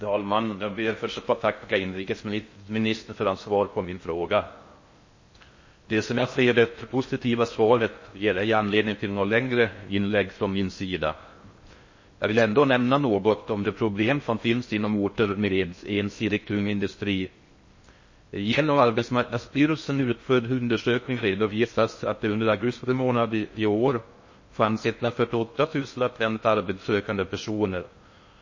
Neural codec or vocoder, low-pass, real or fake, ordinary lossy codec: codec, 16 kHz in and 24 kHz out, 0.6 kbps, FocalCodec, streaming, 4096 codes; 7.2 kHz; fake; MP3, 32 kbps